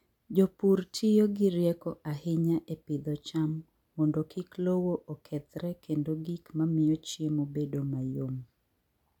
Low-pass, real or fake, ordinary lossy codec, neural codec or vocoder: 19.8 kHz; real; MP3, 96 kbps; none